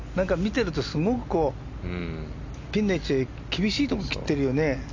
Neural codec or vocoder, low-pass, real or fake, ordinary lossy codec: none; 7.2 kHz; real; MP3, 64 kbps